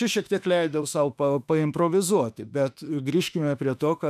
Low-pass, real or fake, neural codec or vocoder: 14.4 kHz; fake; autoencoder, 48 kHz, 32 numbers a frame, DAC-VAE, trained on Japanese speech